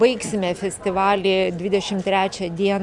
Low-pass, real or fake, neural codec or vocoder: 10.8 kHz; real; none